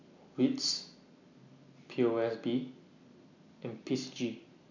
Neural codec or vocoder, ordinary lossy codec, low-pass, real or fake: none; none; 7.2 kHz; real